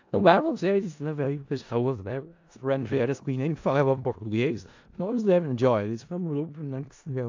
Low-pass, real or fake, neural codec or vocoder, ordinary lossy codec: 7.2 kHz; fake; codec, 16 kHz in and 24 kHz out, 0.4 kbps, LongCat-Audio-Codec, four codebook decoder; AAC, 48 kbps